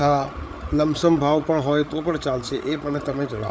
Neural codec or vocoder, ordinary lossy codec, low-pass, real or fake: codec, 16 kHz, 8 kbps, FreqCodec, larger model; none; none; fake